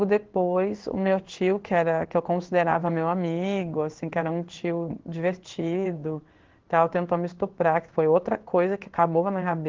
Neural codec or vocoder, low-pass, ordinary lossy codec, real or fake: codec, 16 kHz in and 24 kHz out, 1 kbps, XY-Tokenizer; 7.2 kHz; Opus, 16 kbps; fake